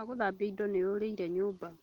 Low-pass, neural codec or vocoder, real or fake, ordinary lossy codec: 19.8 kHz; none; real; Opus, 24 kbps